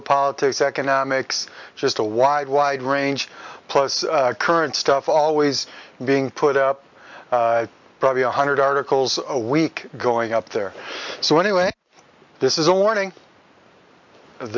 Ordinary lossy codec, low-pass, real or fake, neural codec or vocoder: MP3, 64 kbps; 7.2 kHz; real; none